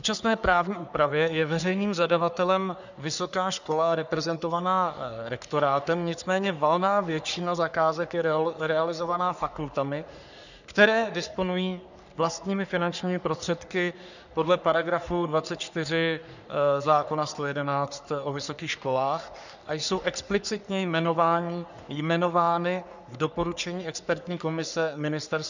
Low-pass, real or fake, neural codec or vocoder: 7.2 kHz; fake; codec, 44.1 kHz, 3.4 kbps, Pupu-Codec